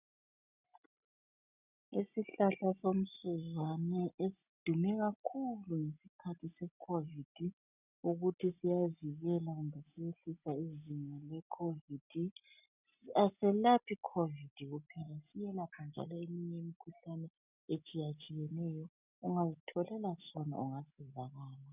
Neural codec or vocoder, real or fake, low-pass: none; real; 3.6 kHz